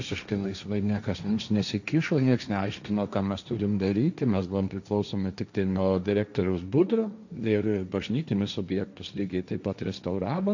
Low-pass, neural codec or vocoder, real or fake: 7.2 kHz; codec, 16 kHz, 1.1 kbps, Voila-Tokenizer; fake